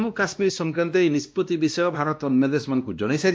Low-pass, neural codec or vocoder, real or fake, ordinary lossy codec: none; codec, 16 kHz, 1 kbps, X-Codec, WavLM features, trained on Multilingual LibriSpeech; fake; none